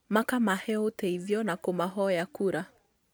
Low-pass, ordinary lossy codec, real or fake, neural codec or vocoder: none; none; real; none